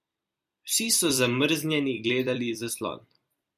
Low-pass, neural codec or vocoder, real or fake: 14.4 kHz; vocoder, 44.1 kHz, 128 mel bands every 256 samples, BigVGAN v2; fake